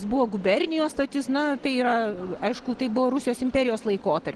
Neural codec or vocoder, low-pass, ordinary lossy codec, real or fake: none; 9.9 kHz; Opus, 16 kbps; real